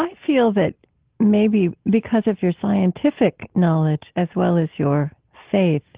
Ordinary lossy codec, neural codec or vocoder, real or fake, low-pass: Opus, 16 kbps; none; real; 3.6 kHz